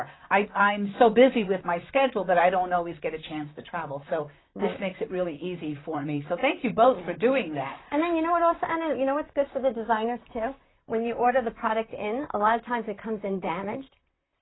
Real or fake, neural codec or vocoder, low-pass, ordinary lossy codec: fake; vocoder, 44.1 kHz, 128 mel bands, Pupu-Vocoder; 7.2 kHz; AAC, 16 kbps